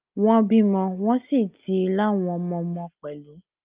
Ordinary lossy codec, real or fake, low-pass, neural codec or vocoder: Opus, 24 kbps; real; 3.6 kHz; none